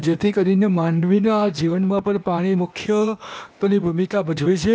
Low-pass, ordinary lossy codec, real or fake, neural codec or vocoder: none; none; fake; codec, 16 kHz, 0.8 kbps, ZipCodec